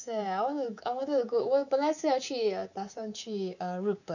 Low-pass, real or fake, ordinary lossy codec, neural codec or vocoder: 7.2 kHz; fake; none; codec, 24 kHz, 3.1 kbps, DualCodec